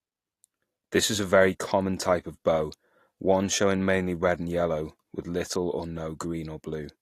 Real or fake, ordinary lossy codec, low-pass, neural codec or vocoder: real; AAC, 48 kbps; 14.4 kHz; none